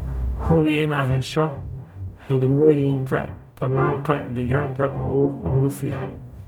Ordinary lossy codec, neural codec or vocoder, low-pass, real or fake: none; codec, 44.1 kHz, 0.9 kbps, DAC; 19.8 kHz; fake